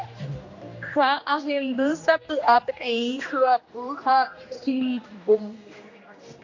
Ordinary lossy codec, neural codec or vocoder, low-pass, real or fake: AAC, 48 kbps; codec, 16 kHz, 1 kbps, X-Codec, HuBERT features, trained on general audio; 7.2 kHz; fake